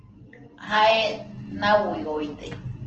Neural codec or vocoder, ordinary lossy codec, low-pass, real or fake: none; Opus, 16 kbps; 7.2 kHz; real